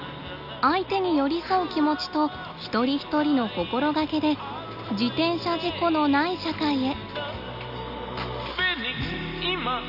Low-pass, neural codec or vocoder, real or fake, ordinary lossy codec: 5.4 kHz; none; real; none